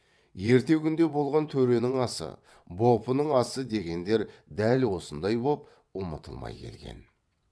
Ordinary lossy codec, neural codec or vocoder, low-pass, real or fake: none; vocoder, 22.05 kHz, 80 mel bands, WaveNeXt; none; fake